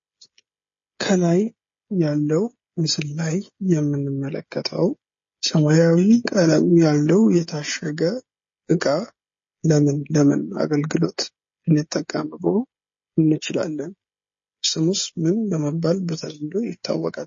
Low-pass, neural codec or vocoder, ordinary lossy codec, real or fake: 7.2 kHz; codec, 16 kHz, 16 kbps, FreqCodec, smaller model; MP3, 32 kbps; fake